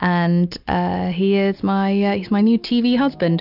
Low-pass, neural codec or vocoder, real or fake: 5.4 kHz; none; real